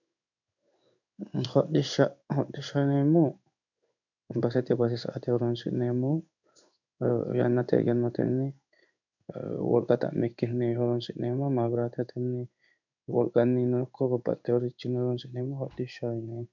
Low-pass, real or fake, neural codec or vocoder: 7.2 kHz; fake; codec, 16 kHz in and 24 kHz out, 1 kbps, XY-Tokenizer